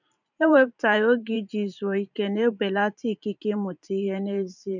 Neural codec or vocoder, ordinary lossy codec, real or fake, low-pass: vocoder, 44.1 kHz, 128 mel bands every 512 samples, BigVGAN v2; none; fake; 7.2 kHz